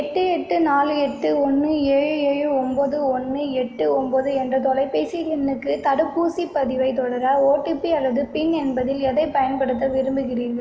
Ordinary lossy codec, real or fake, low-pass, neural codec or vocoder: none; real; none; none